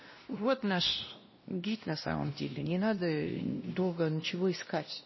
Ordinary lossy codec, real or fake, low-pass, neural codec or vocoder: MP3, 24 kbps; fake; 7.2 kHz; codec, 16 kHz, 1 kbps, X-Codec, WavLM features, trained on Multilingual LibriSpeech